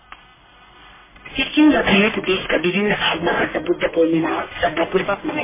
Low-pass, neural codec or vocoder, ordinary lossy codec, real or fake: 3.6 kHz; codec, 44.1 kHz, 1.7 kbps, Pupu-Codec; MP3, 16 kbps; fake